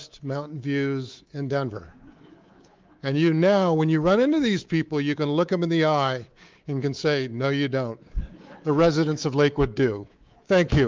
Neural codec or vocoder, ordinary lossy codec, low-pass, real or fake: codec, 24 kHz, 3.1 kbps, DualCodec; Opus, 32 kbps; 7.2 kHz; fake